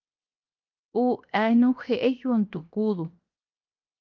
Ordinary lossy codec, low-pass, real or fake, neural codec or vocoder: Opus, 32 kbps; 7.2 kHz; fake; codec, 16 kHz, 0.7 kbps, FocalCodec